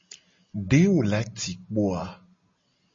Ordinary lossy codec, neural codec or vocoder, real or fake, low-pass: MP3, 32 kbps; none; real; 7.2 kHz